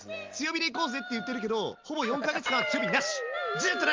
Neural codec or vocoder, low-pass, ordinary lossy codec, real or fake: none; 7.2 kHz; Opus, 32 kbps; real